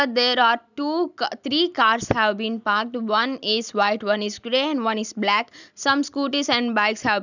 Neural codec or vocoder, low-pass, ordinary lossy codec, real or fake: none; 7.2 kHz; none; real